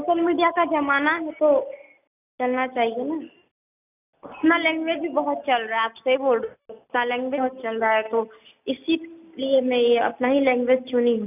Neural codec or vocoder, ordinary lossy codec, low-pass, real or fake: none; none; 3.6 kHz; real